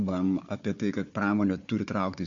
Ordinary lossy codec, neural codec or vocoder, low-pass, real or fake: MP3, 48 kbps; codec, 16 kHz, 4 kbps, FunCodec, trained on Chinese and English, 50 frames a second; 7.2 kHz; fake